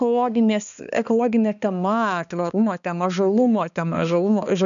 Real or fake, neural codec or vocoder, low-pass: fake; codec, 16 kHz, 2 kbps, X-Codec, HuBERT features, trained on balanced general audio; 7.2 kHz